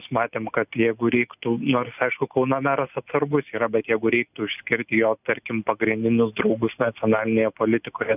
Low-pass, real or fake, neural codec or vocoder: 3.6 kHz; real; none